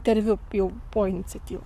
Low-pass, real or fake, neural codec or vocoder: 14.4 kHz; fake; codec, 44.1 kHz, 7.8 kbps, Pupu-Codec